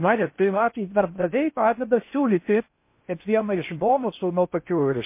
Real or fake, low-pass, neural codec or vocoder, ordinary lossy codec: fake; 3.6 kHz; codec, 16 kHz in and 24 kHz out, 0.6 kbps, FocalCodec, streaming, 4096 codes; MP3, 24 kbps